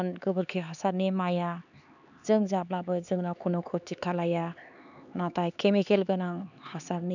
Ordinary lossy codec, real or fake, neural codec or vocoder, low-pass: none; fake; codec, 16 kHz, 4 kbps, X-Codec, HuBERT features, trained on LibriSpeech; 7.2 kHz